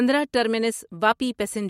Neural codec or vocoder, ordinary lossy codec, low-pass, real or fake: none; MP3, 64 kbps; 19.8 kHz; real